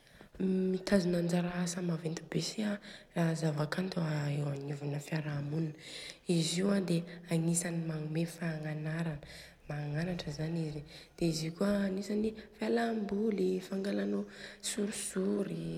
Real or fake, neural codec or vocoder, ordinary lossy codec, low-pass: real; none; MP3, 96 kbps; 19.8 kHz